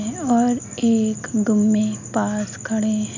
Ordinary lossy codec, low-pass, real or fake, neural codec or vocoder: none; 7.2 kHz; real; none